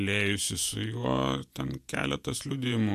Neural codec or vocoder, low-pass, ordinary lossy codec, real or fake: vocoder, 48 kHz, 128 mel bands, Vocos; 14.4 kHz; AAC, 96 kbps; fake